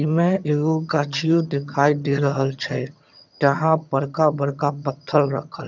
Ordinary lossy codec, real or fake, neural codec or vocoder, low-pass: none; fake; vocoder, 22.05 kHz, 80 mel bands, HiFi-GAN; 7.2 kHz